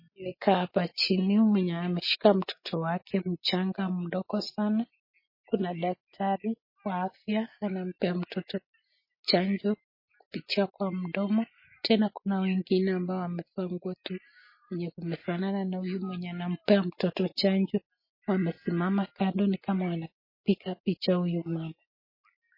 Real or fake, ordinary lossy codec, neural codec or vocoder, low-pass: real; MP3, 24 kbps; none; 5.4 kHz